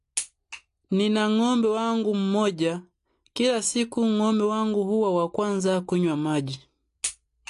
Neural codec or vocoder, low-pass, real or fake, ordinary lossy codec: none; 10.8 kHz; real; AAC, 48 kbps